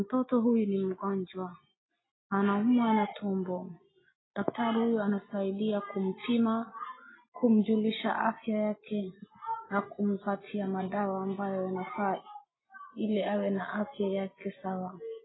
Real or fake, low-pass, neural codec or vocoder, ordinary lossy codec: real; 7.2 kHz; none; AAC, 16 kbps